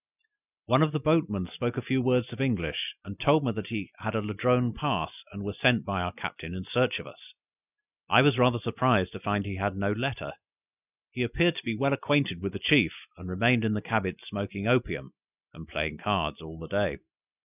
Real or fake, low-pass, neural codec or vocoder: real; 3.6 kHz; none